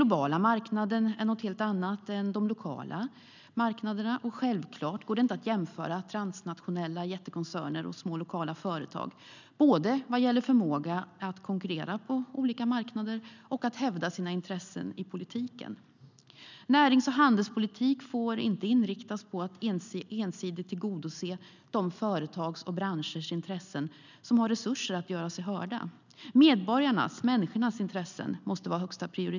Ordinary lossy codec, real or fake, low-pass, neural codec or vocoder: none; real; 7.2 kHz; none